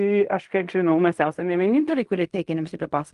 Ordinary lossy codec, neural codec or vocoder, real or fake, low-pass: Opus, 32 kbps; codec, 16 kHz in and 24 kHz out, 0.4 kbps, LongCat-Audio-Codec, fine tuned four codebook decoder; fake; 10.8 kHz